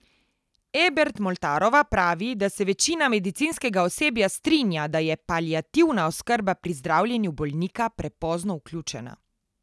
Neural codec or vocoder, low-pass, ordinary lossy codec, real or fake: none; none; none; real